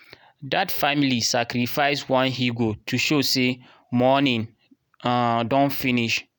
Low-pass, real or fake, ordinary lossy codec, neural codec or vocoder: none; real; none; none